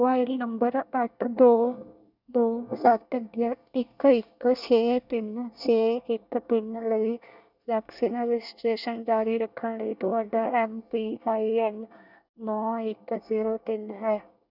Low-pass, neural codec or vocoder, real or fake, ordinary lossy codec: 5.4 kHz; codec, 24 kHz, 1 kbps, SNAC; fake; none